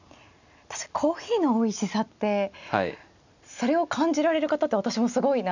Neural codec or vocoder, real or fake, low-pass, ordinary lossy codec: none; real; 7.2 kHz; none